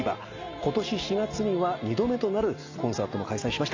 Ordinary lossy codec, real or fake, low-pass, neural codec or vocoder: AAC, 48 kbps; real; 7.2 kHz; none